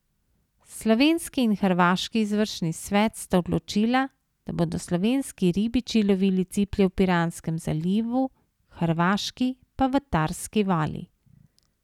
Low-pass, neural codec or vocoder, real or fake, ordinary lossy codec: 19.8 kHz; none; real; none